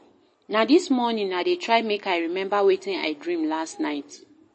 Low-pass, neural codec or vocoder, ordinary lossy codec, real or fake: 9.9 kHz; none; MP3, 32 kbps; real